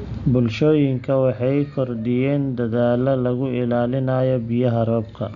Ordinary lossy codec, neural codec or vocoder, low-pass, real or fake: none; none; 7.2 kHz; real